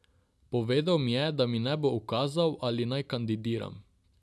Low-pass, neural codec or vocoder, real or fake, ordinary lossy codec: none; none; real; none